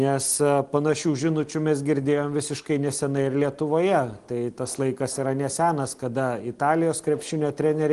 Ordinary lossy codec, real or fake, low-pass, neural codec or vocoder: Opus, 24 kbps; real; 10.8 kHz; none